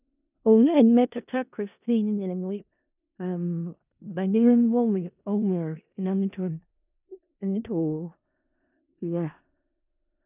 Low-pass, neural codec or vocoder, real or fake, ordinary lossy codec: 3.6 kHz; codec, 16 kHz in and 24 kHz out, 0.4 kbps, LongCat-Audio-Codec, four codebook decoder; fake; none